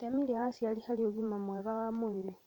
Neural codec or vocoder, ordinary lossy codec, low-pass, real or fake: vocoder, 44.1 kHz, 128 mel bands every 512 samples, BigVGAN v2; none; 19.8 kHz; fake